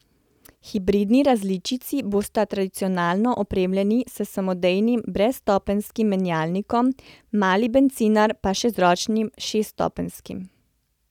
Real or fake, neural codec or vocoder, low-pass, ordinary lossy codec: real; none; 19.8 kHz; none